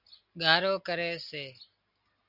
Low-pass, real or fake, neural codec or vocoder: 5.4 kHz; real; none